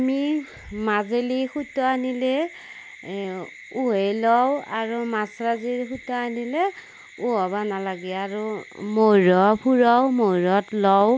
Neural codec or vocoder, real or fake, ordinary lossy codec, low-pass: none; real; none; none